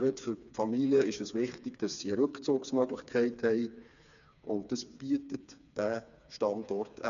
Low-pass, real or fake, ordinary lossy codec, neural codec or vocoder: 7.2 kHz; fake; none; codec, 16 kHz, 4 kbps, FreqCodec, smaller model